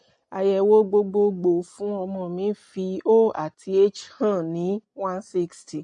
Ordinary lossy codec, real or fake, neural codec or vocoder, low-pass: MP3, 48 kbps; real; none; 9.9 kHz